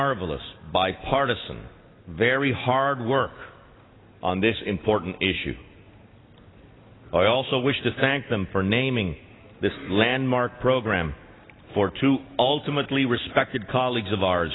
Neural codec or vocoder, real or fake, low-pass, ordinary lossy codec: none; real; 7.2 kHz; AAC, 16 kbps